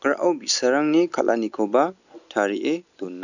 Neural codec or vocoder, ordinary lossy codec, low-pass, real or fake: none; none; 7.2 kHz; real